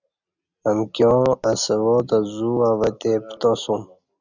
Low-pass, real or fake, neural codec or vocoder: 7.2 kHz; real; none